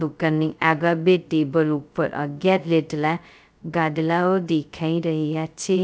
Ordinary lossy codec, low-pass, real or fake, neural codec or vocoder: none; none; fake; codec, 16 kHz, 0.2 kbps, FocalCodec